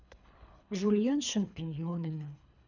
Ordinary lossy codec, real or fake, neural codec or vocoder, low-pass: none; fake; codec, 24 kHz, 3 kbps, HILCodec; 7.2 kHz